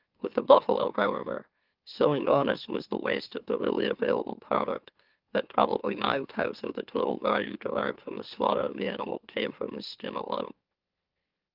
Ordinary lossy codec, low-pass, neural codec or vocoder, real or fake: Opus, 24 kbps; 5.4 kHz; autoencoder, 44.1 kHz, a latent of 192 numbers a frame, MeloTTS; fake